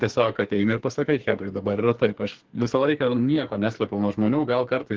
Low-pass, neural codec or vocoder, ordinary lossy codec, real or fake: 7.2 kHz; codec, 44.1 kHz, 2.6 kbps, SNAC; Opus, 16 kbps; fake